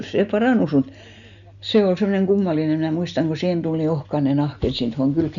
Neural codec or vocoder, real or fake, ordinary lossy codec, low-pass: none; real; none; 7.2 kHz